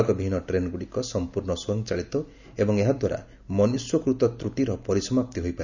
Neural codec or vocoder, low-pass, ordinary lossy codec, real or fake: none; 7.2 kHz; none; real